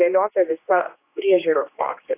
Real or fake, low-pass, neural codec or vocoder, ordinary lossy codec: fake; 3.6 kHz; codec, 16 kHz, 2 kbps, X-Codec, HuBERT features, trained on general audio; AAC, 24 kbps